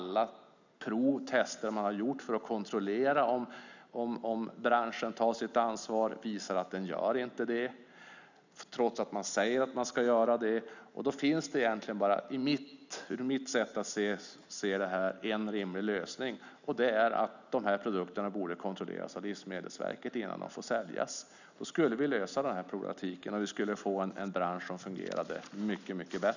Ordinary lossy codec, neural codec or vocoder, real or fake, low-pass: none; none; real; 7.2 kHz